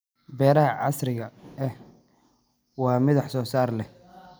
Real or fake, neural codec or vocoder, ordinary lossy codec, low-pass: real; none; none; none